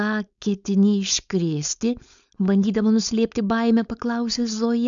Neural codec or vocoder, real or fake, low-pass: codec, 16 kHz, 4.8 kbps, FACodec; fake; 7.2 kHz